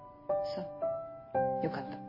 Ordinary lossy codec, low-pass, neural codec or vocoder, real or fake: MP3, 24 kbps; 5.4 kHz; none; real